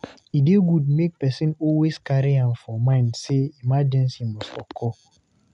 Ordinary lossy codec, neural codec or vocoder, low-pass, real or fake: none; none; 10.8 kHz; real